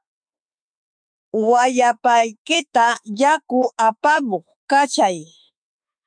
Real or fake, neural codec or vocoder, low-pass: fake; autoencoder, 48 kHz, 32 numbers a frame, DAC-VAE, trained on Japanese speech; 9.9 kHz